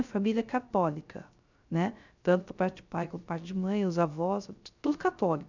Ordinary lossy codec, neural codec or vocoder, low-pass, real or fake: none; codec, 16 kHz, 0.3 kbps, FocalCodec; 7.2 kHz; fake